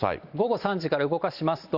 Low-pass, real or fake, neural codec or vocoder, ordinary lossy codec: 5.4 kHz; real; none; Opus, 64 kbps